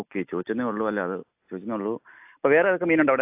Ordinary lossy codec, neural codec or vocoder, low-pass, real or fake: AAC, 32 kbps; none; 3.6 kHz; real